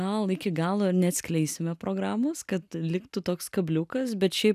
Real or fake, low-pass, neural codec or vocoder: real; 14.4 kHz; none